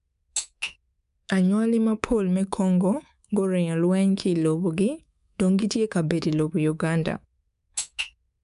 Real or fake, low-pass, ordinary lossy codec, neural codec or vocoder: fake; 10.8 kHz; none; codec, 24 kHz, 3.1 kbps, DualCodec